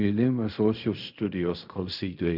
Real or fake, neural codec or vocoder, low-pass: fake; codec, 16 kHz in and 24 kHz out, 0.4 kbps, LongCat-Audio-Codec, fine tuned four codebook decoder; 5.4 kHz